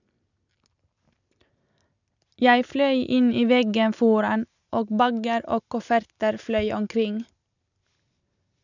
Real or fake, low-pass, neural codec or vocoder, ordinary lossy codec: real; 7.2 kHz; none; none